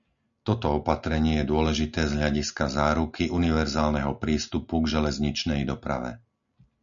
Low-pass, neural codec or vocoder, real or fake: 7.2 kHz; none; real